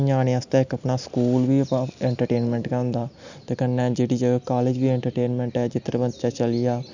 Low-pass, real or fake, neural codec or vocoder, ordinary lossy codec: 7.2 kHz; real; none; none